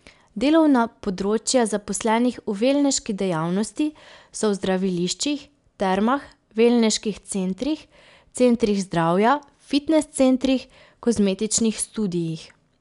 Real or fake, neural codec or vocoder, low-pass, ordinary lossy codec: real; none; 10.8 kHz; none